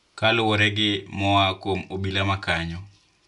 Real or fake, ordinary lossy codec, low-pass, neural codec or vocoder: real; none; 10.8 kHz; none